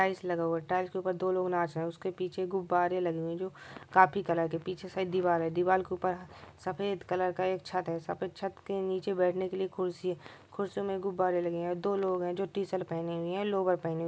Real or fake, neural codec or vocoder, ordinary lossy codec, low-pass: real; none; none; none